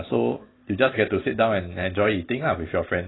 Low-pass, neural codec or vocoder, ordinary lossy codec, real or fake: 7.2 kHz; none; AAC, 16 kbps; real